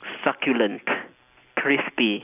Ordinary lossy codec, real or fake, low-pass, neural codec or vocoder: none; real; 3.6 kHz; none